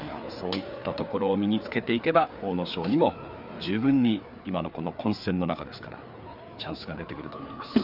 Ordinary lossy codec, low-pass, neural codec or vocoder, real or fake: none; 5.4 kHz; codec, 16 kHz, 4 kbps, FreqCodec, larger model; fake